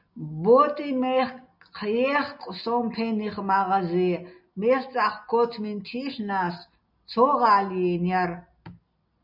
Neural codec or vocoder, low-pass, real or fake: none; 5.4 kHz; real